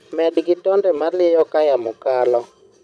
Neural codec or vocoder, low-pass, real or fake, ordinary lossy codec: vocoder, 22.05 kHz, 80 mel bands, Vocos; none; fake; none